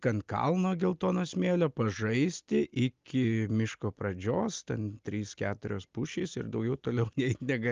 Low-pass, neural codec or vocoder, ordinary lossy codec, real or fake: 7.2 kHz; none; Opus, 32 kbps; real